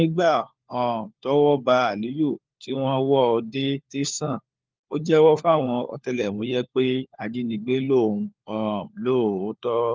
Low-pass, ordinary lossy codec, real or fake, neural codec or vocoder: 7.2 kHz; Opus, 24 kbps; fake; codec, 16 kHz, 4 kbps, FunCodec, trained on LibriTTS, 50 frames a second